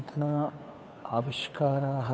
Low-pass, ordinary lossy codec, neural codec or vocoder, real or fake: none; none; codec, 16 kHz, 2 kbps, FunCodec, trained on Chinese and English, 25 frames a second; fake